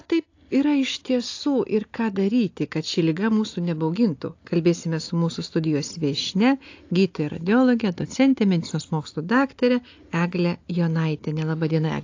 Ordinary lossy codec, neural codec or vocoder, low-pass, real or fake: AAC, 48 kbps; none; 7.2 kHz; real